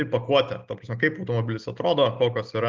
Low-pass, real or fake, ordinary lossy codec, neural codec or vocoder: 7.2 kHz; real; Opus, 32 kbps; none